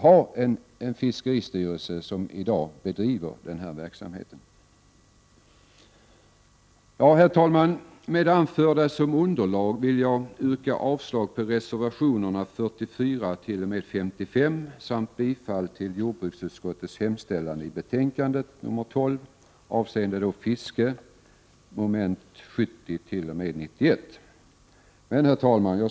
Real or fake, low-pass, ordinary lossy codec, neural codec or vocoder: real; none; none; none